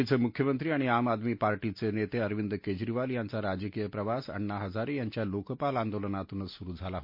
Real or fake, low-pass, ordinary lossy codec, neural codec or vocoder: real; 5.4 kHz; MP3, 32 kbps; none